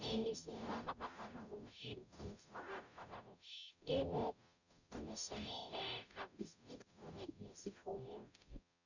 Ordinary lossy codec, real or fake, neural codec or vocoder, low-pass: none; fake; codec, 44.1 kHz, 0.9 kbps, DAC; 7.2 kHz